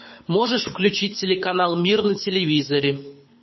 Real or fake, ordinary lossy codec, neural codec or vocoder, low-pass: fake; MP3, 24 kbps; codec, 24 kHz, 6 kbps, HILCodec; 7.2 kHz